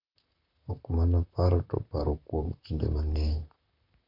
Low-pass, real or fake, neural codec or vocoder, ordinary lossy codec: 5.4 kHz; fake; vocoder, 44.1 kHz, 128 mel bands, Pupu-Vocoder; none